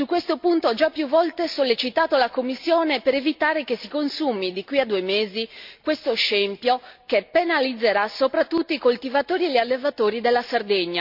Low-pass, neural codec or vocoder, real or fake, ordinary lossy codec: 5.4 kHz; none; real; MP3, 48 kbps